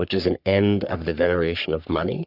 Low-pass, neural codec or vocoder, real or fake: 5.4 kHz; codec, 44.1 kHz, 3.4 kbps, Pupu-Codec; fake